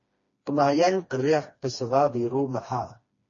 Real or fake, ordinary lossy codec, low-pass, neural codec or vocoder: fake; MP3, 32 kbps; 7.2 kHz; codec, 16 kHz, 2 kbps, FreqCodec, smaller model